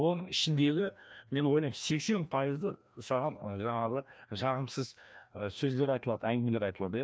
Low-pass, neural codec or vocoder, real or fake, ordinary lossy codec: none; codec, 16 kHz, 1 kbps, FreqCodec, larger model; fake; none